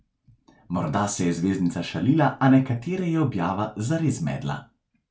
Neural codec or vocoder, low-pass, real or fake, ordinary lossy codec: none; none; real; none